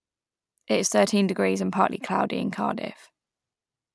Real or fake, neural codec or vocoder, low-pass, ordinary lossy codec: real; none; none; none